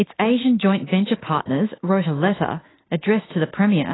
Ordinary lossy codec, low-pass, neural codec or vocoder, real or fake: AAC, 16 kbps; 7.2 kHz; autoencoder, 48 kHz, 32 numbers a frame, DAC-VAE, trained on Japanese speech; fake